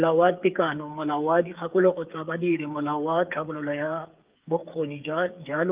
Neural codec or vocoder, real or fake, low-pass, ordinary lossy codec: codec, 16 kHz, 8 kbps, FreqCodec, smaller model; fake; 3.6 kHz; Opus, 32 kbps